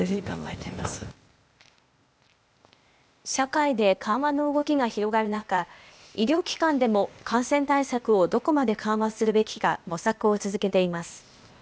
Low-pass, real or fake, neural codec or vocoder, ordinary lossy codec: none; fake; codec, 16 kHz, 0.8 kbps, ZipCodec; none